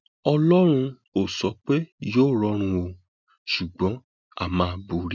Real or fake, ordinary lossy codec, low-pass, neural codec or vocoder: real; none; 7.2 kHz; none